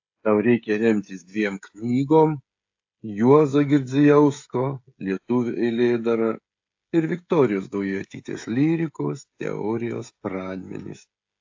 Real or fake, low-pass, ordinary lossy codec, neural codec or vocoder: fake; 7.2 kHz; AAC, 48 kbps; codec, 16 kHz, 16 kbps, FreqCodec, smaller model